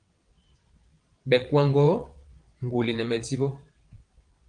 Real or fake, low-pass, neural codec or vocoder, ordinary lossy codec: fake; 9.9 kHz; vocoder, 22.05 kHz, 80 mel bands, WaveNeXt; Opus, 24 kbps